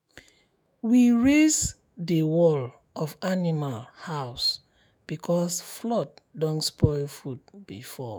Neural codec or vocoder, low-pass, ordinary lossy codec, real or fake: autoencoder, 48 kHz, 128 numbers a frame, DAC-VAE, trained on Japanese speech; none; none; fake